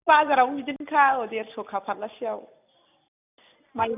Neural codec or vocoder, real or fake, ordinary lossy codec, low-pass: none; real; none; 3.6 kHz